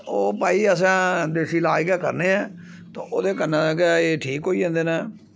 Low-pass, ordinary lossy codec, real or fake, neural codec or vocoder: none; none; real; none